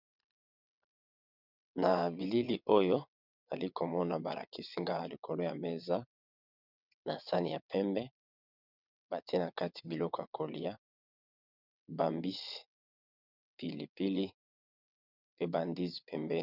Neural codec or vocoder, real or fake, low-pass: vocoder, 22.05 kHz, 80 mel bands, WaveNeXt; fake; 5.4 kHz